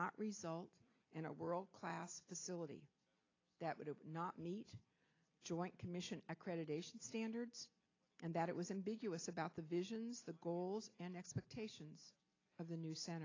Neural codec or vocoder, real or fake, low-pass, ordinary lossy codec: none; real; 7.2 kHz; AAC, 32 kbps